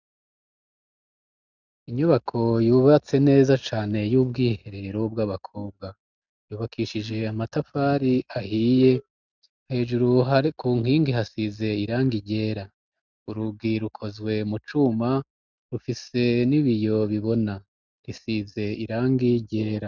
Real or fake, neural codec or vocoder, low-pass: real; none; 7.2 kHz